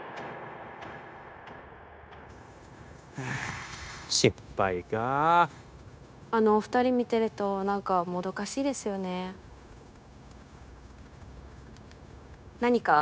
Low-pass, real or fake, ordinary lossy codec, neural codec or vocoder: none; fake; none; codec, 16 kHz, 0.9 kbps, LongCat-Audio-Codec